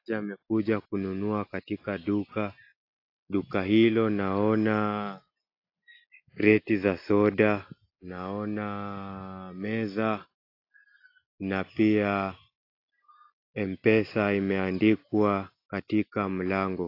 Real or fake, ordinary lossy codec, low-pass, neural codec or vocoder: real; AAC, 32 kbps; 5.4 kHz; none